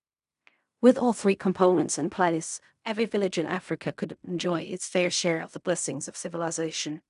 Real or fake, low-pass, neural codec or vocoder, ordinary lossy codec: fake; 10.8 kHz; codec, 16 kHz in and 24 kHz out, 0.4 kbps, LongCat-Audio-Codec, fine tuned four codebook decoder; none